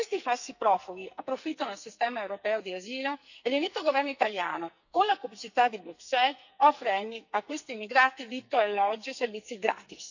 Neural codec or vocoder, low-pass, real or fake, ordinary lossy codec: codec, 44.1 kHz, 2.6 kbps, SNAC; 7.2 kHz; fake; none